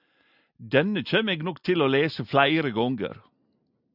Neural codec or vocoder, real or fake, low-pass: none; real; 5.4 kHz